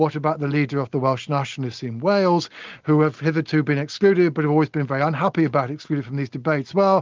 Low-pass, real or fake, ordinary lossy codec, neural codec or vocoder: 7.2 kHz; real; Opus, 32 kbps; none